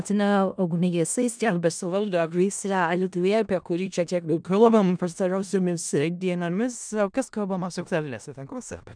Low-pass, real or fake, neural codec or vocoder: 9.9 kHz; fake; codec, 16 kHz in and 24 kHz out, 0.4 kbps, LongCat-Audio-Codec, four codebook decoder